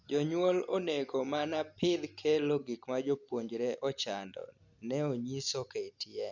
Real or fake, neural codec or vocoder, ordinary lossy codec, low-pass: real; none; none; 7.2 kHz